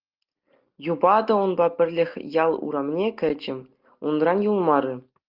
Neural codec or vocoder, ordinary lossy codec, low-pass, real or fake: none; Opus, 24 kbps; 5.4 kHz; real